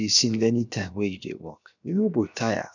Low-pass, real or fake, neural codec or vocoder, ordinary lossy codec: 7.2 kHz; fake; codec, 16 kHz, 0.7 kbps, FocalCodec; none